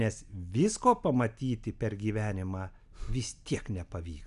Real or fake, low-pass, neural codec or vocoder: real; 10.8 kHz; none